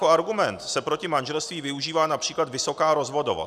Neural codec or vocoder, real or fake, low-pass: none; real; 14.4 kHz